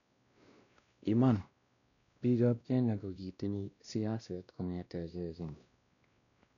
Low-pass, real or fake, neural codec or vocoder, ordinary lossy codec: 7.2 kHz; fake; codec, 16 kHz, 1 kbps, X-Codec, WavLM features, trained on Multilingual LibriSpeech; none